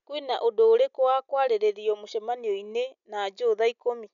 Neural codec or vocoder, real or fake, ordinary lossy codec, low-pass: none; real; none; 7.2 kHz